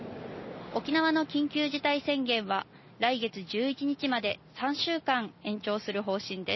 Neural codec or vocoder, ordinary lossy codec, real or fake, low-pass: none; MP3, 24 kbps; real; 7.2 kHz